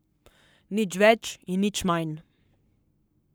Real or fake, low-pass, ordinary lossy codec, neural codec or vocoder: fake; none; none; codec, 44.1 kHz, 7.8 kbps, Pupu-Codec